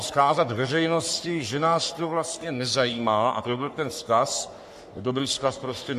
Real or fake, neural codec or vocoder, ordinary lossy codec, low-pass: fake; codec, 44.1 kHz, 3.4 kbps, Pupu-Codec; MP3, 64 kbps; 14.4 kHz